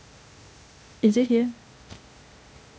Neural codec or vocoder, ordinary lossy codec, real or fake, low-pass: codec, 16 kHz, 0.8 kbps, ZipCodec; none; fake; none